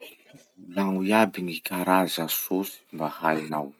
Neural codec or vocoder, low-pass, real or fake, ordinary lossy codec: none; 19.8 kHz; real; none